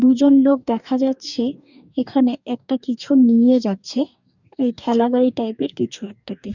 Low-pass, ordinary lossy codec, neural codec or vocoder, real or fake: 7.2 kHz; none; codec, 44.1 kHz, 2.6 kbps, DAC; fake